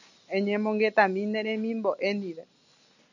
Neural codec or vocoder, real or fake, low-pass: none; real; 7.2 kHz